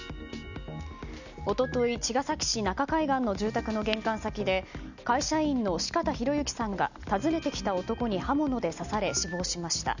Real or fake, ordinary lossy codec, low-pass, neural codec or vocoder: real; none; 7.2 kHz; none